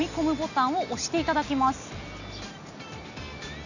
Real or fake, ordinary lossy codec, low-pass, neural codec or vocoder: real; none; 7.2 kHz; none